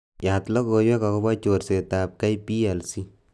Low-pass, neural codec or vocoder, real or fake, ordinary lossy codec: none; none; real; none